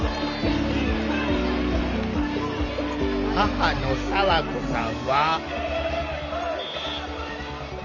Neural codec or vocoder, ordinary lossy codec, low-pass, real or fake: none; none; 7.2 kHz; real